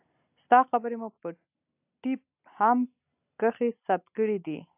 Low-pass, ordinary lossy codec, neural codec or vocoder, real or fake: 3.6 kHz; AAC, 32 kbps; none; real